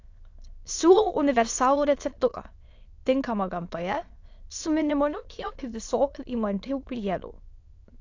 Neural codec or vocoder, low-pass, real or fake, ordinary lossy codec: autoencoder, 22.05 kHz, a latent of 192 numbers a frame, VITS, trained on many speakers; 7.2 kHz; fake; AAC, 48 kbps